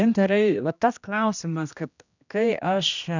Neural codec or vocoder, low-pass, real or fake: codec, 16 kHz, 1 kbps, X-Codec, HuBERT features, trained on general audio; 7.2 kHz; fake